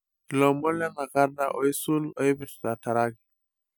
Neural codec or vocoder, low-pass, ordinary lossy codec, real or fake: none; none; none; real